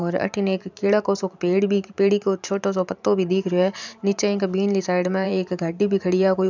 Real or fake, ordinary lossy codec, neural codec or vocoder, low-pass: fake; none; vocoder, 44.1 kHz, 128 mel bands every 512 samples, BigVGAN v2; 7.2 kHz